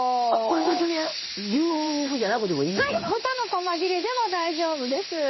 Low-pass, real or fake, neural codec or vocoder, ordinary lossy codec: 7.2 kHz; fake; codec, 16 kHz in and 24 kHz out, 1 kbps, XY-Tokenizer; MP3, 24 kbps